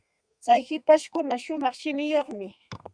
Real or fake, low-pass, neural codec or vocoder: fake; 9.9 kHz; codec, 32 kHz, 1.9 kbps, SNAC